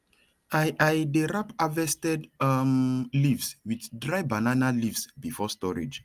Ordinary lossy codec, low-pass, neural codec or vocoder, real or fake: Opus, 32 kbps; 14.4 kHz; none; real